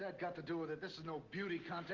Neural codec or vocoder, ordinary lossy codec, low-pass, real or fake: none; Opus, 16 kbps; 7.2 kHz; real